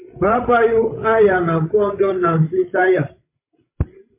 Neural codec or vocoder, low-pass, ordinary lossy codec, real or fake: codec, 16 kHz, 16 kbps, FreqCodec, larger model; 3.6 kHz; MP3, 24 kbps; fake